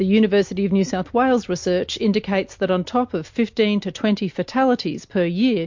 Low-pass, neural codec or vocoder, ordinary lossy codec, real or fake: 7.2 kHz; none; MP3, 48 kbps; real